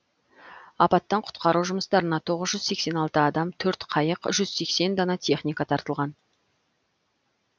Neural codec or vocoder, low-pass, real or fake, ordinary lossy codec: none; none; real; none